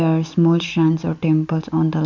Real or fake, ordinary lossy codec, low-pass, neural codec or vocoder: real; none; 7.2 kHz; none